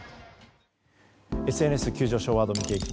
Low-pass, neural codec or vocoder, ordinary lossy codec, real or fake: none; none; none; real